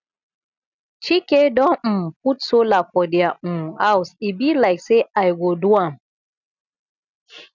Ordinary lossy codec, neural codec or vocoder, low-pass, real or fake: none; none; 7.2 kHz; real